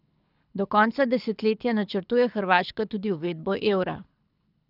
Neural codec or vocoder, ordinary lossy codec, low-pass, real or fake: codec, 24 kHz, 6 kbps, HILCodec; none; 5.4 kHz; fake